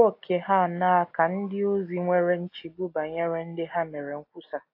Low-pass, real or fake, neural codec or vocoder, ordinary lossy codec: 5.4 kHz; real; none; AAC, 32 kbps